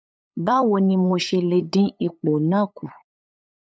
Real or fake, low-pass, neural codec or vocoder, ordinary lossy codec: fake; none; codec, 16 kHz, 8 kbps, FunCodec, trained on LibriTTS, 25 frames a second; none